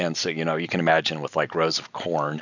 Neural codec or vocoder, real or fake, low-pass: none; real; 7.2 kHz